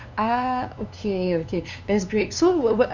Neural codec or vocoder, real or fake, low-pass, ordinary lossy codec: codec, 16 kHz, 2 kbps, FunCodec, trained on LibriTTS, 25 frames a second; fake; 7.2 kHz; none